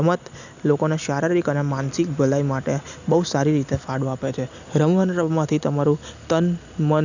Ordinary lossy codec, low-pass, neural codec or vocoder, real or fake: none; 7.2 kHz; none; real